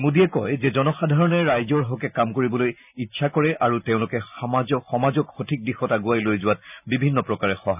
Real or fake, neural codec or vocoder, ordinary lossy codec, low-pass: real; none; none; 3.6 kHz